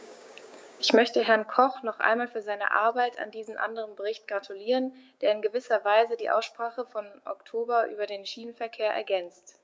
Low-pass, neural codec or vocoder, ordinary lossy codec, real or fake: none; codec, 16 kHz, 6 kbps, DAC; none; fake